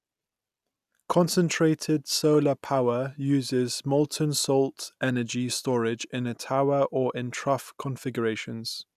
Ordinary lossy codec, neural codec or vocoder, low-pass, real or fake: none; none; 14.4 kHz; real